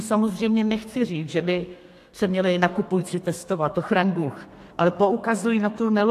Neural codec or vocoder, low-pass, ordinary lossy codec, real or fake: codec, 32 kHz, 1.9 kbps, SNAC; 14.4 kHz; AAC, 64 kbps; fake